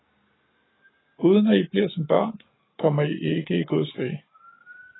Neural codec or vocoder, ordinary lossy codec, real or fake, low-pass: codec, 44.1 kHz, 7.8 kbps, Pupu-Codec; AAC, 16 kbps; fake; 7.2 kHz